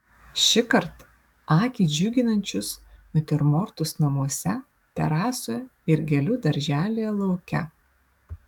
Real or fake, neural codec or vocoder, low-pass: fake; codec, 44.1 kHz, 7.8 kbps, DAC; 19.8 kHz